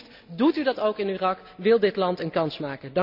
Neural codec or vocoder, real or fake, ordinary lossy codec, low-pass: none; real; none; 5.4 kHz